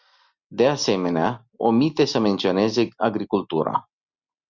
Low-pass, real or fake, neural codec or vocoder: 7.2 kHz; real; none